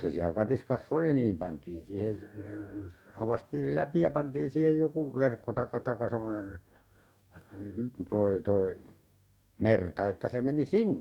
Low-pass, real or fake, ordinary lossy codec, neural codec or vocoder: 19.8 kHz; fake; none; codec, 44.1 kHz, 2.6 kbps, DAC